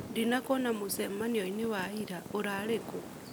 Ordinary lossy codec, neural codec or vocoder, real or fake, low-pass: none; none; real; none